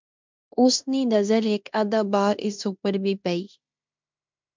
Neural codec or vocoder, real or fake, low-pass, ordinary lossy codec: codec, 16 kHz in and 24 kHz out, 0.9 kbps, LongCat-Audio-Codec, fine tuned four codebook decoder; fake; 7.2 kHz; MP3, 64 kbps